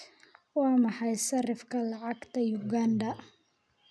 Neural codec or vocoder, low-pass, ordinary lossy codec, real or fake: none; none; none; real